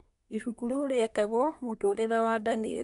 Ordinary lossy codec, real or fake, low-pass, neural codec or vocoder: MP3, 96 kbps; fake; 10.8 kHz; codec, 24 kHz, 1 kbps, SNAC